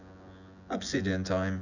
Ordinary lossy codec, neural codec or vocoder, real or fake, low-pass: none; vocoder, 24 kHz, 100 mel bands, Vocos; fake; 7.2 kHz